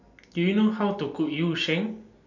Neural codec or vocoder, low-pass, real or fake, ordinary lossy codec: none; 7.2 kHz; real; none